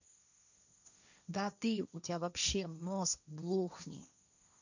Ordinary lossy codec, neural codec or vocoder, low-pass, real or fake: none; codec, 16 kHz, 1.1 kbps, Voila-Tokenizer; 7.2 kHz; fake